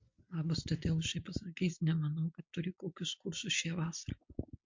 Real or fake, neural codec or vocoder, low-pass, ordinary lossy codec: real; none; 7.2 kHz; MP3, 48 kbps